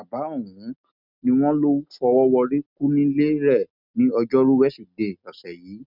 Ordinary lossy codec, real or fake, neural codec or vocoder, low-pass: none; real; none; 5.4 kHz